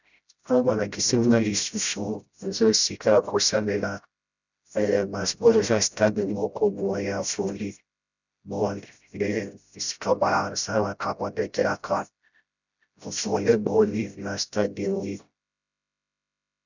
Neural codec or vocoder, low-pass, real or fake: codec, 16 kHz, 0.5 kbps, FreqCodec, smaller model; 7.2 kHz; fake